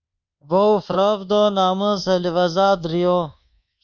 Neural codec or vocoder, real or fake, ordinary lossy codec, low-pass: codec, 24 kHz, 1.2 kbps, DualCodec; fake; Opus, 64 kbps; 7.2 kHz